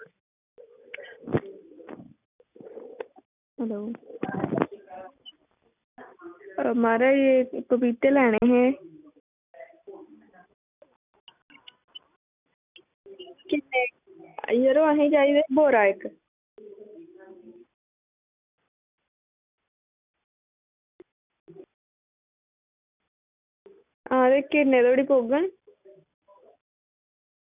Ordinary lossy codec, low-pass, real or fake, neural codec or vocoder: none; 3.6 kHz; real; none